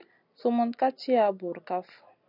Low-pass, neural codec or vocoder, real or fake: 5.4 kHz; none; real